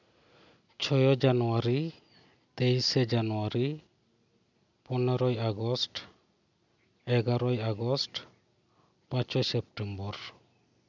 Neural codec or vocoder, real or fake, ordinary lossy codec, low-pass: none; real; none; 7.2 kHz